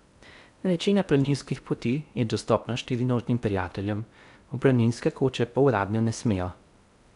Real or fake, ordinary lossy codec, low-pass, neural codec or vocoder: fake; none; 10.8 kHz; codec, 16 kHz in and 24 kHz out, 0.6 kbps, FocalCodec, streaming, 2048 codes